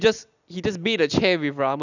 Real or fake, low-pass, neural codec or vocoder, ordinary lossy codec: real; 7.2 kHz; none; none